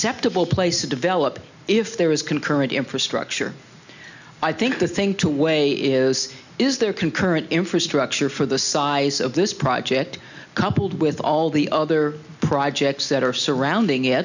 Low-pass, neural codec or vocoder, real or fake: 7.2 kHz; none; real